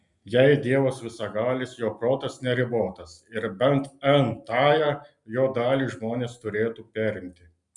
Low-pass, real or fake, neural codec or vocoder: 10.8 kHz; real; none